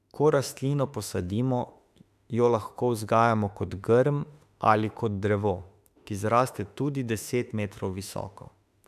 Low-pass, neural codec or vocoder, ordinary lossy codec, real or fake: 14.4 kHz; autoencoder, 48 kHz, 32 numbers a frame, DAC-VAE, trained on Japanese speech; none; fake